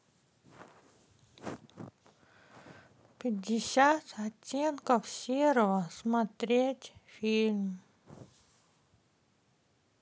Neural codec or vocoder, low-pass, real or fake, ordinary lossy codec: none; none; real; none